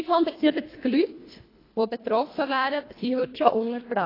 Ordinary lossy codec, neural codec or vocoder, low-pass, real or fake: AAC, 24 kbps; codec, 24 kHz, 1.5 kbps, HILCodec; 5.4 kHz; fake